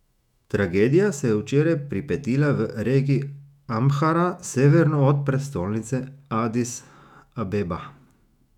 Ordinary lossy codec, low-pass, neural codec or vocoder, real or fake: none; 19.8 kHz; autoencoder, 48 kHz, 128 numbers a frame, DAC-VAE, trained on Japanese speech; fake